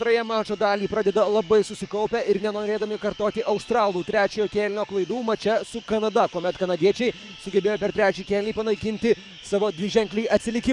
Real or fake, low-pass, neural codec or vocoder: fake; 10.8 kHz; codec, 44.1 kHz, 7.8 kbps, DAC